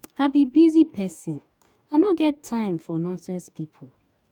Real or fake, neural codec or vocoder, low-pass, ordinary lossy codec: fake; codec, 44.1 kHz, 2.6 kbps, DAC; 19.8 kHz; none